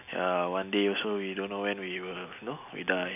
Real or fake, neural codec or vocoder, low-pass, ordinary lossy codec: real; none; 3.6 kHz; none